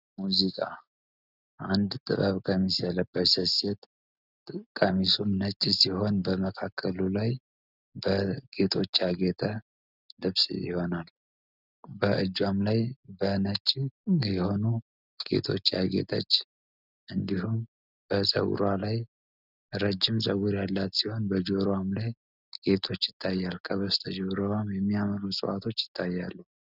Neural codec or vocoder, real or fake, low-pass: none; real; 5.4 kHz